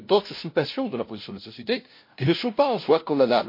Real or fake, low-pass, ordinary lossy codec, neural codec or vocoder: fake; 5.4 kHz; MP3, 32 kbps; codec, 16 kHz, 0.5 kbps, FunCodec, trained on LibriTTS, 25 frames a second